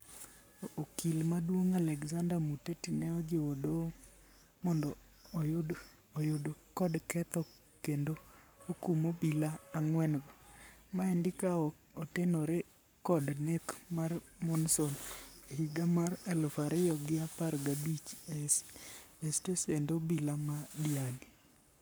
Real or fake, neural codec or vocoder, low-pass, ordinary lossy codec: fake; codec, 44.1 kHz, 7.8 kbps, Pupu-Codec; none; none